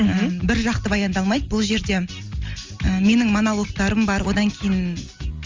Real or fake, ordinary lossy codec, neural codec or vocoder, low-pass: real; Opus, 32 kbps; none; 7.2 kHz